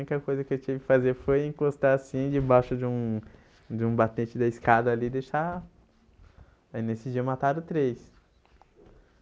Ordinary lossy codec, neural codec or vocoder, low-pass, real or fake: none; none; none; real